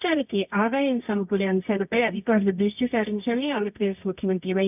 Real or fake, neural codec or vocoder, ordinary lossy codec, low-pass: fake; codec, 24 kHz, 0.9 kbps, WavTokenizer, medium music audio release; none; 3.6 kHz